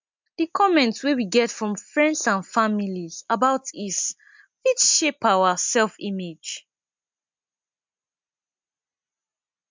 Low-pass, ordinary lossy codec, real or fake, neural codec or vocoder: 7.2 kHz; MP3, 64 kbps; real; none